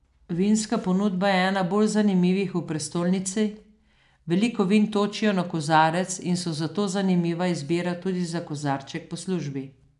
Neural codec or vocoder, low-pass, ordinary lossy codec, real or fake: none; 10.8 kHz; none; real